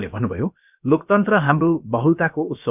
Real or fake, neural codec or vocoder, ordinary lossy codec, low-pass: fake; codec, 16 kHz, about 1 kbps, DyCAST, with the encoder's durations; none; 3.6 kHz